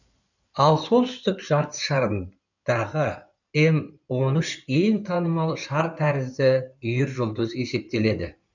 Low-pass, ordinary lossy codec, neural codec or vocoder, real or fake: 7.2 kHz; none; codec, 16 kHz in and 24 kHz out, 2.2 kbps, FireRedTTS-2 codec; fake